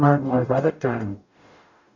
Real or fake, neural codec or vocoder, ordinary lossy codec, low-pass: fake; codec, 44.1 kHz, 0.9 kbps, DAC; none; 7.2 kHz